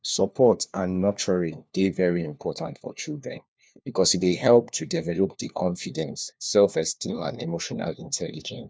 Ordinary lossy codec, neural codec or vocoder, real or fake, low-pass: none; codec, 16 kHz, 1 kbps, FunCodec, trained on LibriTTS, 50 frames a second; fake; none